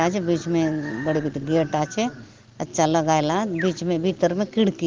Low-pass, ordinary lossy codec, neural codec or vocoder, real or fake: 7.2 kHz; Opus, 16 kbps; none; real